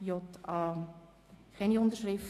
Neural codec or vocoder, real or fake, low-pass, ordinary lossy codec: autoencoder, 48 kHz, 128 numbers a frame, DAC-VAE, trained on Japanese speech; fake; 14.4 kHz; AAC, 48 kbps